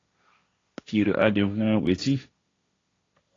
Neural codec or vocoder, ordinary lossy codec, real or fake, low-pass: codec, 16 kHz, 1.1 kbps, Voila-Tokenizer; AAC, 32 kbps; fake; 7.2 kHz